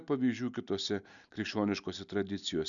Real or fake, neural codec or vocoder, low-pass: real; none; 7.2 kHz